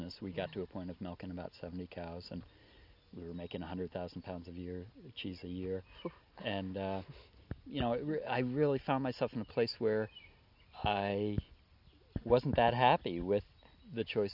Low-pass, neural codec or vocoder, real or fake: 5.4 kHz; none; real